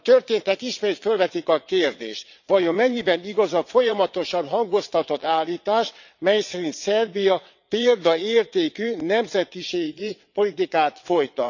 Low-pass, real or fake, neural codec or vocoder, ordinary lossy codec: 7.2 kHz; fake; vocoder, 22.05 kHz, 80 mel bands, WaveNeXt; none